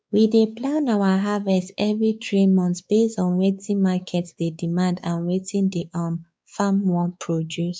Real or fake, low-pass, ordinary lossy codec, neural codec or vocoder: fake; none; none; codec, 16 kHz, 4 kbps, X-Codec, WavLM features, trained on Multilingual LibriSpeech